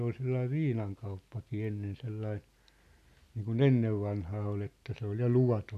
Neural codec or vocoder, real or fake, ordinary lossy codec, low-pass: none; real; none; 14.4 kHz